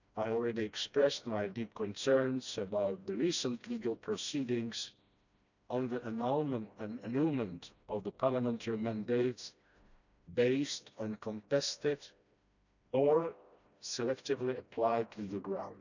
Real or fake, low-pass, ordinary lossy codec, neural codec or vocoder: fake; 7.2 kHz; none; codec, 16 kHz, 1 kbps, FreqCodec, smaller model